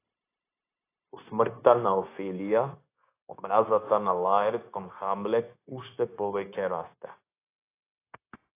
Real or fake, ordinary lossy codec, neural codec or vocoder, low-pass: fake; AAC, 24 kbps; codec, 16 kHz, 0.9 kbps, LongCat-Audio-Codec; 3.6 kHz